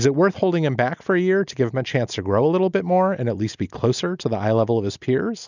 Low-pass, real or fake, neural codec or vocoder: 7.2 kHz; real; none